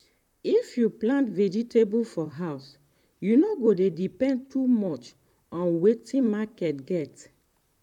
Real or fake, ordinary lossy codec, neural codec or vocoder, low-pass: fake; none; vocoder, 44.1 kHz, 128 mel bands, Pupu-Vocoder; 19.8 kHz